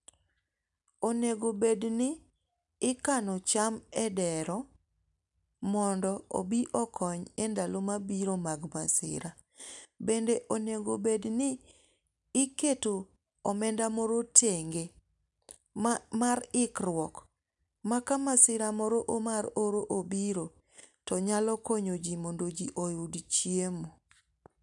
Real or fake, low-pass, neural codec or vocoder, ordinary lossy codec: real; 10.8 kHz; none; none